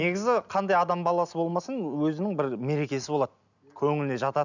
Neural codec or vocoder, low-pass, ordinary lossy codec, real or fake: none; 7.2 kHz; none; real